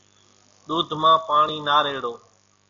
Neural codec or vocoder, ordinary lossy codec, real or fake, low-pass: none; AAC, 64 kbps; real; 7.2 kHz